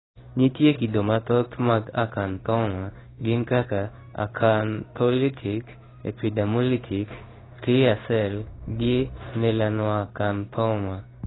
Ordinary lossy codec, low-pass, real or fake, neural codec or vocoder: AAC, 16 kbps; 7.2 kHz; fake; codec, 16 kHz in and 24 kHz out, 1 kbps, XY-Tokenizer